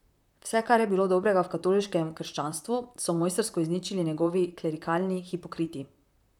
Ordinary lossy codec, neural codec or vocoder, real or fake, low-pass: none; vocoder, 44.1 kHz, 128 mel bands, Pupu-Vocoder; fake; 19.8 kHz